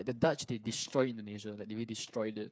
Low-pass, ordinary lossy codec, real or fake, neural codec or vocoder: none; none; fake; codec, 16 kHz, 8 kbps, FreqCodec, smaller model